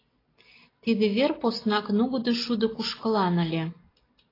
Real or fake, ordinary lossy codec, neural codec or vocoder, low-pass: real; AAC, 24 kbps; none; 5.4 kHz